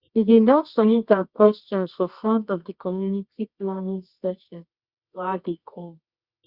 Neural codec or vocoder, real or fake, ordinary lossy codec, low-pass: codec, 24 kHz, 0.9 kbps, WavTokenizer, medium music audio release; fake; Opus, 64 kbps; 5.4 kHz